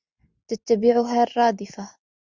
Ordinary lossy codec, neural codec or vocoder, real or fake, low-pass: Opus, 64 kbps; none; real; 7.2 kHz